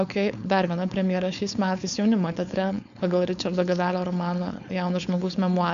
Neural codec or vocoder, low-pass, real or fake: codec, 16 kHz, 4.8 kbps, FACodec; 7.2 kHz; fake